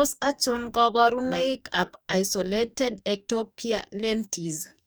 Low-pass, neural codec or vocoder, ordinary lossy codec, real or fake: none; codec, 44.1 kHz, 2.6 kbps, DAC; none; fake